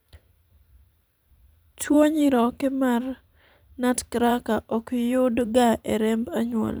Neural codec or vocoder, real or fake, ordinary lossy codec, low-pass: vocoder, 44.1 kHz, 128 mel bands every 512 samples, BigVGAN v2; fake; none; none